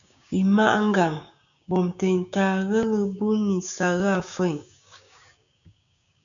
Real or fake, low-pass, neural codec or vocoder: fake; 7.2 kHz; codec, 16 kHz, 6 kbps, DAC